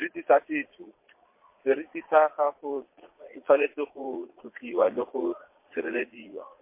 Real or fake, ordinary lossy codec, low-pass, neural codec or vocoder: fake; MP3, 24 kbps; 3.6 kHz; vocoder, 22.05 kHz, 80 mel bands, Vocos